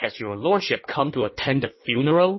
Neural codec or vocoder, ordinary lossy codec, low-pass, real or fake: codec, 16 kHz in and 24 kHz out, 1.1 kbps, FireRedTTS-2 codec; MP3, 24 kbps; 7.2 kHz; fake